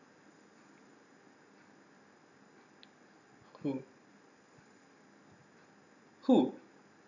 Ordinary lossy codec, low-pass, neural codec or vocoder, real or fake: none; 7.2 kHz; none; real